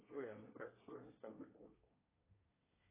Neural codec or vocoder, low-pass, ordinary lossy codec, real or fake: codec, 24 kHz, 1 kbps, SNAC; 3.6 kHz; AAC, 16 kbps; fake